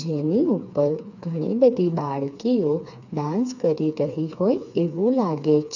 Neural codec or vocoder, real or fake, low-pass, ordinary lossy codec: codec, 16 kHz, 4 kbps, FreqCodec, smaller model; fake; 7.2 kHz; none